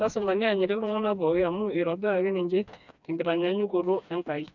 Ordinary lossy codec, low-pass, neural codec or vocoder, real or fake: none; 7.2 kHz; codec, 16 kHz, 2 kbps, FreqCodec, smaller model; fake